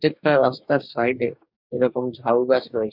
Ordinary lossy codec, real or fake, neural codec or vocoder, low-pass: none; fake; codec, 44.1 kHz, 2.6 kbps, DAC; 5.4 kHz